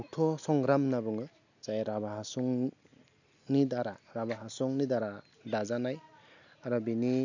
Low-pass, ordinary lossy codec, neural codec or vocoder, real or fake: 7.2 kHz; none; none; real